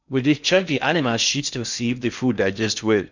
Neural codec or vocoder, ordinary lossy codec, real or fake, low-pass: codec, 16 kHz in and 24 kHz out, 0.6 kbps, FocalCodec, streaming, 2048 codes; none; fake; 7.2 kHz